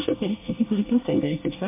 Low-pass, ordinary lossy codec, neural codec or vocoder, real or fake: 3.6 kHz; MP3, 24 kbps; codec, 24 kHz, 1 kbps, SNAC; fake